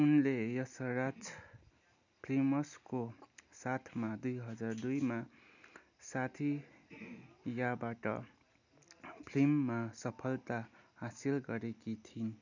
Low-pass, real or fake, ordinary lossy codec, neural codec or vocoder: 7.2 kHz; real; none; none